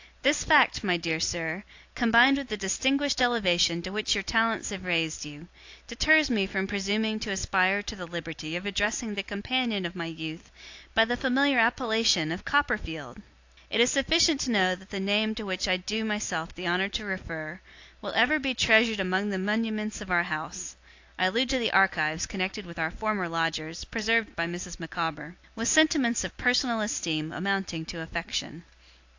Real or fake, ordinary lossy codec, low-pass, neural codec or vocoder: real; AAC, 48 kbps; 7.2 kHz; none